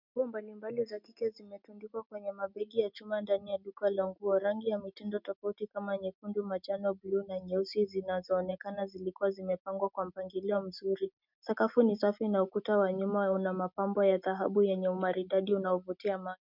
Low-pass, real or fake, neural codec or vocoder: 5.4 kHz; real; none